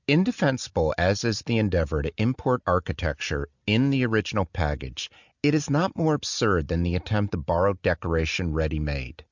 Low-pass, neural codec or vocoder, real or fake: 7.2 kHz; none; real